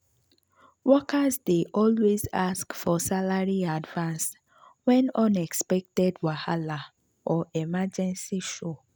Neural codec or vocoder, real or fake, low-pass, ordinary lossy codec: none; real; none; none